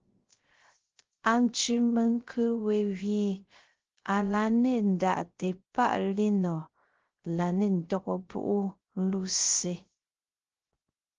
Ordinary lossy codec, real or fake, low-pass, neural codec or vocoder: Opus, 16 kbps; fake; 7.2 kHz; codec, 16 kHz, 0.3 kbps, FocalCodec